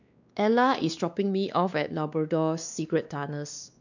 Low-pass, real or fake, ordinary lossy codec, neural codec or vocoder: 7.2 kHz; fake; none; codec, 16 kHz, 2 kbps, X-Codec, WavLM features, trained on Multilingual LibriSpeech